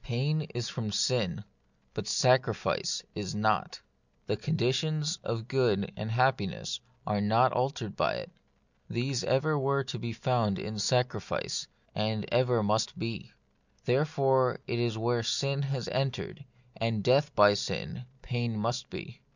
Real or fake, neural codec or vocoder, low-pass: real; none; 7.2 kHz